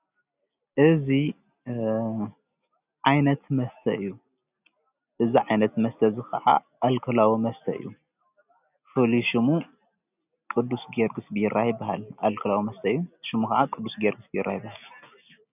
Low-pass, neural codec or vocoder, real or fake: 3.6 kHz; none; real